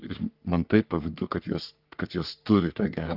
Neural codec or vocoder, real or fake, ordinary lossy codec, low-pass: codec, 44.1 kHz, 3.4 kbps, Pupu-Codec; fake; Opus, 32 kbps; 5.4 kHz